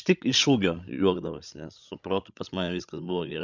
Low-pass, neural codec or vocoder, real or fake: 7.2 kHz; codec, 16 kHz, 16 kbps, FreqCodec, larger model; fake